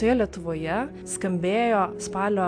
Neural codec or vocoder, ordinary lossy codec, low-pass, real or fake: none; AAC, 64 kbps; 9.9 kHz; real